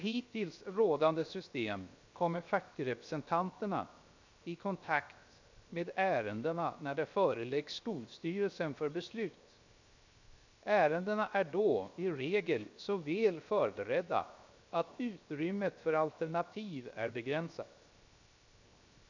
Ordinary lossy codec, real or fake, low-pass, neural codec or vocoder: MP3, 64 kbps; fake; 7.2 kHz; codec, 16 kHz, 0.7 kbps, FocalCodec